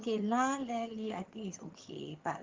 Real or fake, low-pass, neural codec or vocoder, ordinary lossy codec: fake; 7.2 kHz; vocoder, 22.05 kHz, 80 mel bands, HiFi-GAN; Opus, 32 kbps